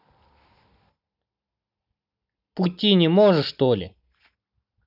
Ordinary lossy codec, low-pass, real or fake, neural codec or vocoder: none; 5.4 kHz; real; none